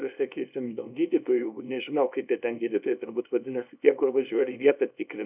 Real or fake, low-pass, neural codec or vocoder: fake; 3.6 kHz; codec, 24 kHz, 0.9 kbps, WavTokenizer, small release